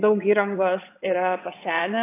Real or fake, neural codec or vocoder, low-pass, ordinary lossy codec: fake; vocoder, 22.05 kHz, 80 mel bands, WaveNeXt; 3.6 kHz; AAC, 24 kbps